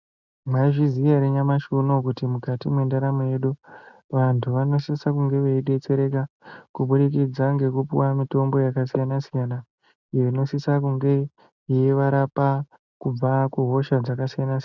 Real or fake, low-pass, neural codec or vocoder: real; 7.2 kHz; none